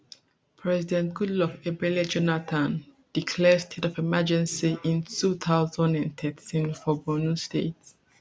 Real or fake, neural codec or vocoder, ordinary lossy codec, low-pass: real; none; none; none